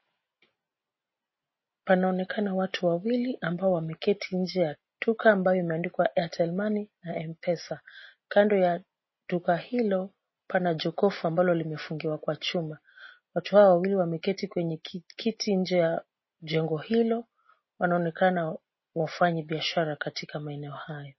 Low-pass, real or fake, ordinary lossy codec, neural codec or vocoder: 7.2 kHz; real; MP3, 24 kbps; none